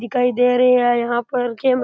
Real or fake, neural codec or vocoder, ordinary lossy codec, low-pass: real; none; none; none